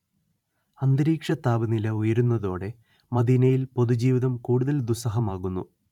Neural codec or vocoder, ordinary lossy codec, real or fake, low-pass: vocoder, 44.1 kHz, 128 mel bands every 512 samples, BigVGAN v2; none; fake; 19.8 kHz